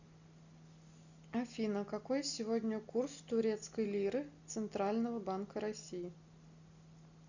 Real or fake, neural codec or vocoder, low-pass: real; none; 7.2 kHz